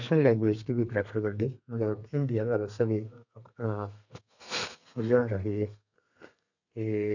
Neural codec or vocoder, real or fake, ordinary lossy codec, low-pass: codec, 32 kHz, 1.9 kbps, SNAC; fake; none; 7.2 kHz